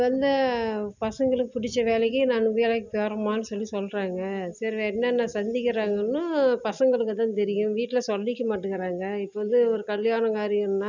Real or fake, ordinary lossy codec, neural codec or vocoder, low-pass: real; none; none; 7.2 kHz